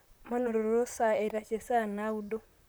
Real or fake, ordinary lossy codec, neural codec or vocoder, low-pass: fake; none; vocoder, 44.1 kHz, 128 mel bands, Pupu-Vocoder; none